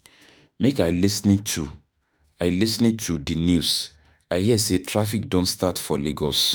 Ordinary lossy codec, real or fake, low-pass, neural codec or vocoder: none; fake; none; autoencoder, 48 kHz, 32 numbers a frame, DAC-VAE, trained on Japanese speech